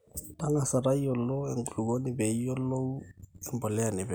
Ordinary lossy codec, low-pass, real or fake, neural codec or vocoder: none; none; real; none